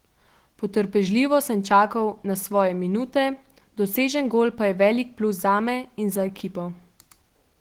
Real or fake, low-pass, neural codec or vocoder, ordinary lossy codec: fake; 19.8 kHz; autoencoder, 48 kHz, 128 numbers a frame, DAC-VAE, trained on Japanese speech; Opus, 16 kbps